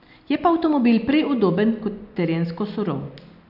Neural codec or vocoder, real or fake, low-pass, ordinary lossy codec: none; real; 5.4 kHz; none